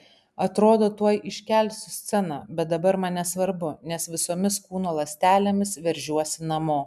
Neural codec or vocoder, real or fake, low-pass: none; real; 14.4 kHz